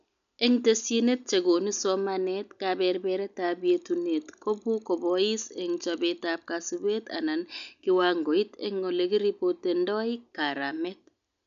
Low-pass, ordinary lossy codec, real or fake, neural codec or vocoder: 7.2 kHz; none; real; none